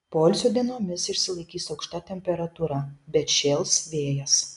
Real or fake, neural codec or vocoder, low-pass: real; none; 10.8 kHz